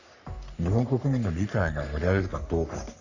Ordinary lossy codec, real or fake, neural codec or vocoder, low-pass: none; fake; codec, 44.1 kHz, 3.4 kbps, Pupu-Codec; 7.2 kHz